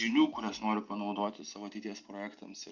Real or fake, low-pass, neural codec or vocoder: real; 7.2 kHz; none